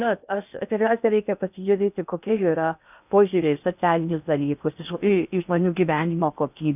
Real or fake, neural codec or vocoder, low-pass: fake; codec, 16 kHz in and 24 kHz out, 0.6 kbps, FocalCodec, streaming, 2048 codes; 3.6 kHz